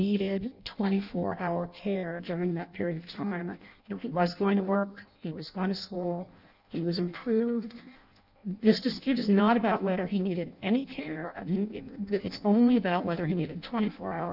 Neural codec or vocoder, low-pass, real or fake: codec, 16 kHz in and 24 kHz out, 0.6 kbps, FireRedTTS-2 codec; 5.4 kHz; fake